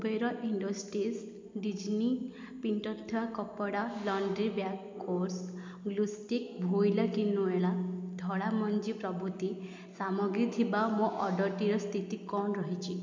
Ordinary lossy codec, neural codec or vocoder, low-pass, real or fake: MP3, 64 kbps; none; 7.2 kHz; real